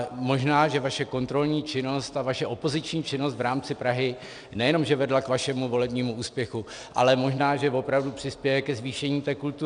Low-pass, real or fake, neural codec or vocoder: 9.9 kHz; real; none